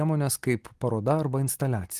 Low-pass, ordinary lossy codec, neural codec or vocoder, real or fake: 14.4 kHz; Opus, 24 kbps; none; real